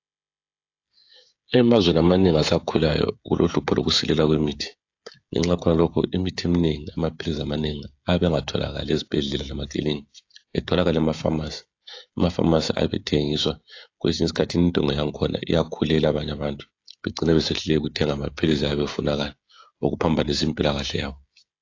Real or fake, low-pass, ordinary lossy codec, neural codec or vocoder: fake; 7.2 kHz; AAC, 48 kbps; codec, 16 kHz, 16 kbps, FreqCodec, smaller model